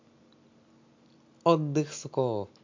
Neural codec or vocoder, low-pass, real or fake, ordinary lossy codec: none; 7.2 kHz; real; MP3, 48 kbps